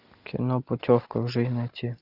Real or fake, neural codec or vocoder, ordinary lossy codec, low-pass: real; none; Opus, 64 kbps; 5.4 kHz